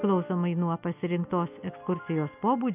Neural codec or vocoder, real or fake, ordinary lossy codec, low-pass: none; real; AAC, 32 kbps; 3.6 kHz